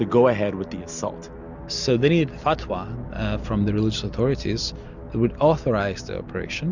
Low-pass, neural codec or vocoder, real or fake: 7.2 kHz; none; real